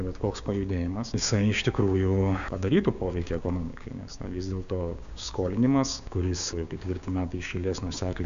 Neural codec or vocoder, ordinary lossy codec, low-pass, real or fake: codec, 16 kHz, 6 kbps, DAC; AAC, 96 kbps; 7.2 kHz; fake